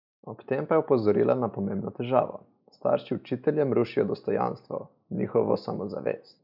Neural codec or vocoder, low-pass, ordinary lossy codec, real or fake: none; 5.4 kHz; none; real